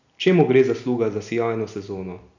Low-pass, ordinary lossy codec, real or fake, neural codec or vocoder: 7.2 kHz; none; real; none